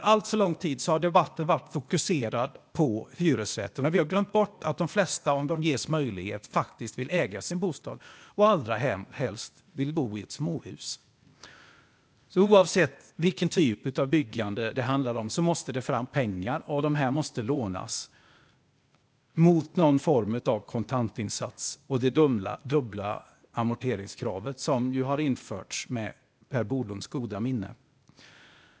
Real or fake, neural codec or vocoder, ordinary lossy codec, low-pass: fake; codec, 16 kHz, 0.8 kbps, ZipCodec; none; none